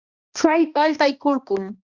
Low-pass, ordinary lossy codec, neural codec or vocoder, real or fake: 7.2 kHz; Opus, 64 kbps; codec, 16 kHz, 1 kbps, X-Codec, HuBERT features, trained on balanced general audio; fake